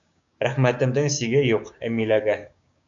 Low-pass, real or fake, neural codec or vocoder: 7.2 kHz; fake; codec, 16 kHz, 6 kbps, DAC